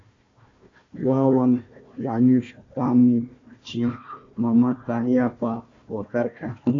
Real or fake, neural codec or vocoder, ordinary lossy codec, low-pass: fake; codec, 16 kHz, 1 kbps, FunCodec, trained on Chinese and English, 50 frames a second; MP3, 48 kbps; 7.2 kHz